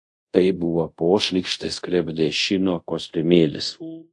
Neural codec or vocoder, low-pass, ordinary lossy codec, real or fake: codec, 24 kHz, 0.5 kbps, DualCodec; 10.8 kHz; AAC, 64 kbps; fake